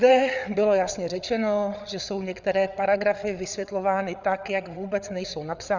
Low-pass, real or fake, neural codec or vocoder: 7.2 kHz; fake; codec, 16 kHz, 8 kbps, FreqCodec, larger model